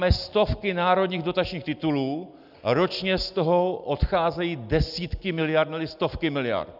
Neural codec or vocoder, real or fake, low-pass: none; real; 5.4 kHz